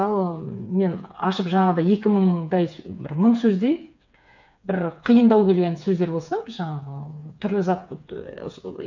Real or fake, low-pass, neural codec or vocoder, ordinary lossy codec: fake; 7.2 kHz; codec, 16 kHz, 4 kbps, FreqCodec, smaller model; none